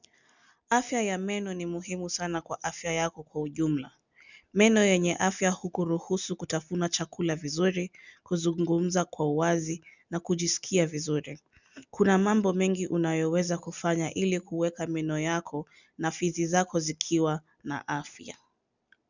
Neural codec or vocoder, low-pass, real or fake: none; 7.2 kHz; real